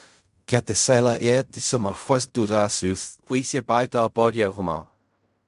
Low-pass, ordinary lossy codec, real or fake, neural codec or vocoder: 10.8 kHz; MP3, 64 kbps; fake; codec, 16 kHz in and 24 kHz out, 0.4 kbps, LongCat-Audio-Codec, fine tuned four codebook decoder